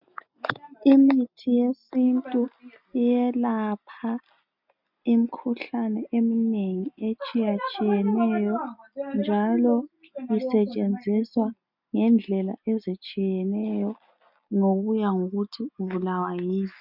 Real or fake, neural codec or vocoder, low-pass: real; none; 5.4 kHz